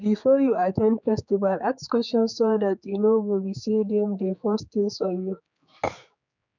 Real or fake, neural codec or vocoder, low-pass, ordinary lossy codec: fake; codec, 16 kHz, 4 kbps, X-Codec, HuBERT features, trained on general audio; 7.2 kHz; none